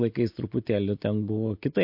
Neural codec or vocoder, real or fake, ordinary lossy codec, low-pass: none; real; MP3, 32 kbps; 5.4 kHz